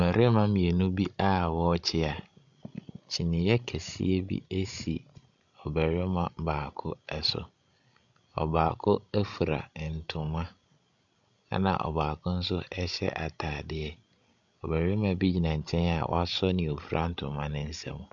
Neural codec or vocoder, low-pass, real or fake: codec, 16 kHz, 16 kbps, FreqCodec, larger model; 7.2 kHz; fake